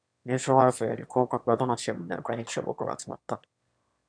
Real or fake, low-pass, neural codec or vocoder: fake; 9.9 kHz; autoencoder, 22.05 kHz, a latent of 192 numbers a frame, VITS, trained on one speaker